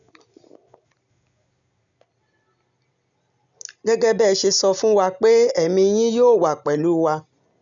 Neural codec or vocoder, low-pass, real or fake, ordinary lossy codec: none; 7.2 kHz; real; none